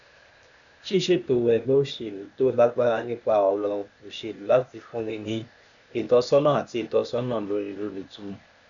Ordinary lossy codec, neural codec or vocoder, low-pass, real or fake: none; codec, 16 kHz, 0.8 kbps, ZipCodec; 7.2 kHz; fake